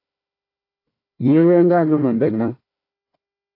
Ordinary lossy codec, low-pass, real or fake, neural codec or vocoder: AAC, 32 kbps; 5.4 kHz; fake; codec, 16 kHz, 1 kbps, FunCodec, trained on Chinese and English, 50 frames a second